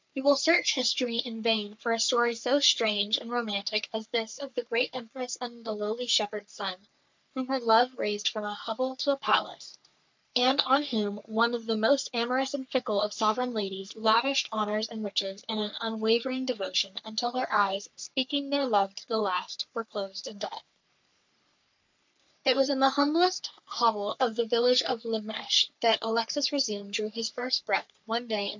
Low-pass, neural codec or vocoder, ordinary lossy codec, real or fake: 7.2 kHz; codec, 44.1 kHz, 3.4 kbps, Pupu-Codec; MP3, 64 kbps; fake